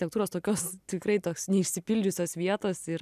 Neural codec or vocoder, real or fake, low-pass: none; real; 14.4 kHz